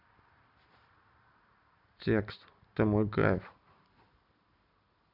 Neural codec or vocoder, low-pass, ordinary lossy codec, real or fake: none; 5.4 kHz; none; real